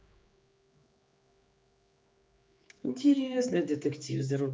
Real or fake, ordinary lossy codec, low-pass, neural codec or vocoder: fake; none; none; codec, 16 kHz, 4 kbps, X-Codec, HuBERT features, trained on general audio